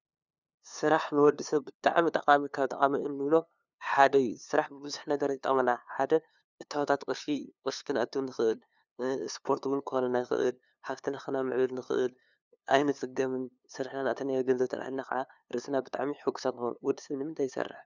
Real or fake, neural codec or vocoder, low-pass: fake; codec, 16 kHz, 2 kbps, FunCodec, trained on LibriTTS, 25 frames a second; 7.2 kHz